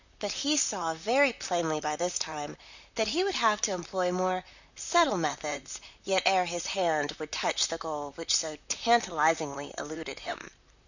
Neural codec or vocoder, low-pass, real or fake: vocoder, 44.1 kHz, 128 mel bands, Pupu-Vocoder; 7.2 kHz; fake